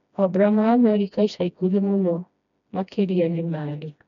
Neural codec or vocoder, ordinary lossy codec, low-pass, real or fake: codec, 16 kHz, 1 kbps, FreqCodec, smaller model; none; 7.2 kHz; fake